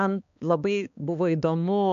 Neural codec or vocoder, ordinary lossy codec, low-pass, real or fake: codec, 16 kHz, 2 kbps, X-Codec, HuBERT features, trained on balanced general audio; MP3, 64 kbps; 7.2 kHz; fake